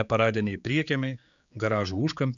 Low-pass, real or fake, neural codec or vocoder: 7.2 kHz; fake; codec, 16 kHz, 4 kbps, X-Codec, HuBERT features, trained on general audio